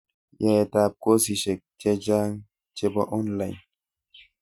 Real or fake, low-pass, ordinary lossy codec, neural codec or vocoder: real; 19.8 kHz; none; none